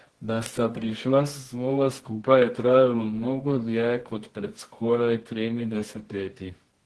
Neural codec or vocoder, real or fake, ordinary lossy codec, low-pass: codec, 24 kHz, 0.9 kbps, WavTokenizer, medium music audio release; fake; Opus, 16 kbps; 10.8 kHz